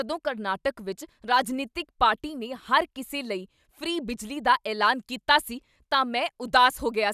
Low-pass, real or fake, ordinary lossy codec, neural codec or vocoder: 14.4 kHz; real; none; none